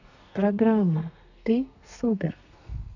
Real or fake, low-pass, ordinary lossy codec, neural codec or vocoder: fake; 7.2 kHz; none; codec, 32 kHz, 1.9 kbps, SNAC